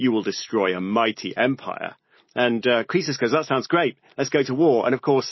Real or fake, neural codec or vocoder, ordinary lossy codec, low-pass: real; none; MP3, 24 kbps; 7.2 kHz